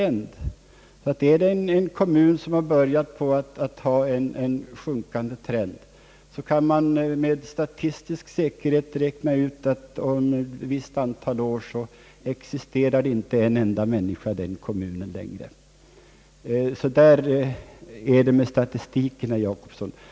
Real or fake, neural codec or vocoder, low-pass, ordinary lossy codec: real; none; none; none